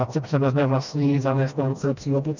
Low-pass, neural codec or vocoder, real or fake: 7.2 kHz; codec, 16 kHz, 1 kbps, FreqCodec, smaller model; fake